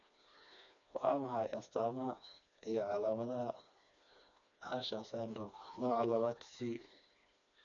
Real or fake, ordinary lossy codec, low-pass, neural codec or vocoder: fake; none; 7.2 kHz; codec, 16 kHz, 2 kbps, FreqCodec, smaller model